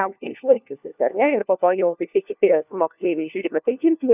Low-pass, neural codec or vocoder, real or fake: 3.6 kHz; codec, 16 kHz, 1 kbps, FunCodec, trained on LibriTTS, 50 frames a second; fake